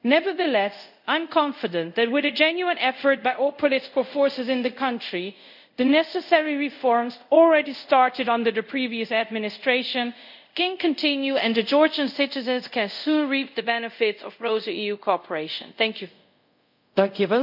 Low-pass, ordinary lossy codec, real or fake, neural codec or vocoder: 5.4 kHz; none; fake; codec, 24 kHz, 0.5 kbps, DualCodec